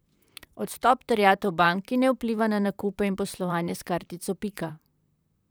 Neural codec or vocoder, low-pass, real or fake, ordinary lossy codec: vocoder, 44.1 kHz, 128 mel bands, Pupu-Vocoder; none; fake; none